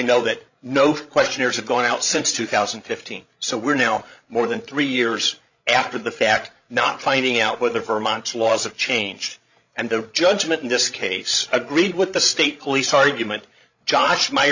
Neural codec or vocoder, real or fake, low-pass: codec, 16 kHz, 16 kbps, FreqCodec, larger model; fake; 7.2 kHz